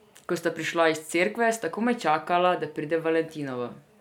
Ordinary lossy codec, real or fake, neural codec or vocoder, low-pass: none; real; none; 19.8 kHz